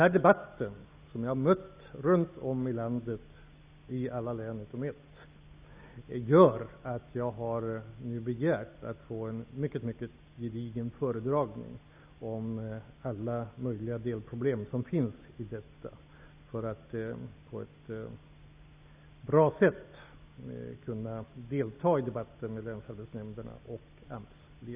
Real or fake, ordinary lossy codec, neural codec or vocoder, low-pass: real; Opus, 64 kbps; none; 3.6 kHz